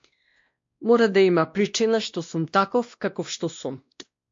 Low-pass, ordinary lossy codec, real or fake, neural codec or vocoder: 7.2 kHz; MP3, 48 kbps; fake; codec, 16 kHz, 1 kbps, X-Codec, WavLM features, trained on Multilingual LibriSpeech